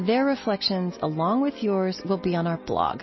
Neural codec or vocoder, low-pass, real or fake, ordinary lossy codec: none; 7.2 kHz; real; MP3, 24 kbps